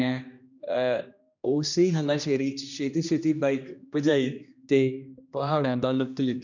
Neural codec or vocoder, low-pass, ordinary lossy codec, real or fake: codec, 16 kHz, 1 kbps, X-Codec, HuBERT features, trained on general audio; 7.2 kHz; none; fake